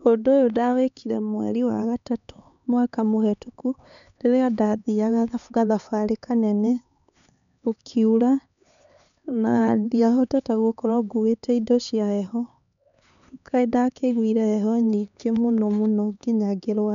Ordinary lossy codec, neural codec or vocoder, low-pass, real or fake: none; codec, 16 kHz, 4 kbps, X-Codec, HuBERT features, trained on LibriSpeech; 7.2 kHz; fake